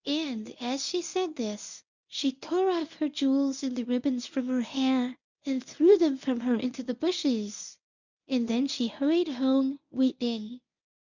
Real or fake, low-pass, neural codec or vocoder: fake; 7.2 kHz; codec, 24 kHz, 0.9 kbps, WavTokenizer, medium speech release version 1